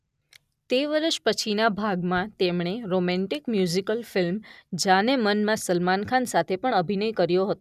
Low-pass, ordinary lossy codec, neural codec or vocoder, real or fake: 14.4 kHz; none; none; real